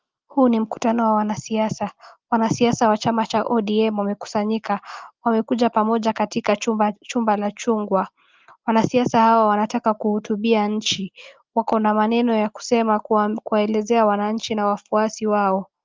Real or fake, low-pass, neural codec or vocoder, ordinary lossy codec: real; 7.2 kHz; none; Opus, 32 kbps